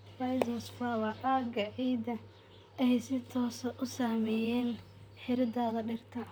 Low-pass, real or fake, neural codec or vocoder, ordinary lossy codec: none; fake; vocoder, 44.1 kHz, 128 mel bands, Pupu-Vocoder; none